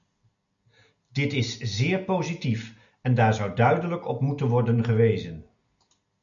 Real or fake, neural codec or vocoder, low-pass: real; none; 7.2 kHz